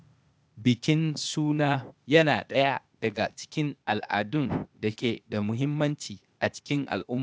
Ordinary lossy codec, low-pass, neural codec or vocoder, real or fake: none; none; codec, 16 kHz, 0.8 kbps, ZipCodec; fake